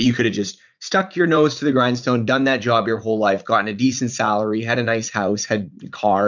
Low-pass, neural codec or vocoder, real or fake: 7.2 kHz; vocoder, 44.1 kHz, 80 mel bands, Vocos; fake